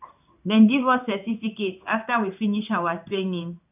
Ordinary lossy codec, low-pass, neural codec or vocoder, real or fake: none; 3.6 kHz; codec, 16 kHz in and 24 kHz out, 1 kbps, XY-Tokenizer; fake